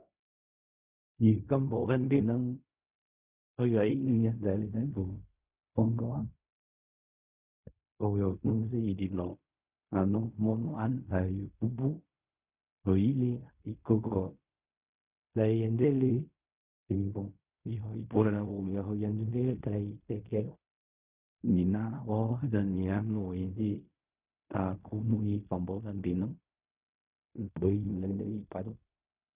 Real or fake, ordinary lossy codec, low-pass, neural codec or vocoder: fake; Opus, 64 kbps; 3.6 kHz; codec, 16 kHz in and 24 kHz out, 0.4 kbps, LongCat-Audio-Codec, fine tuned four codebook decoder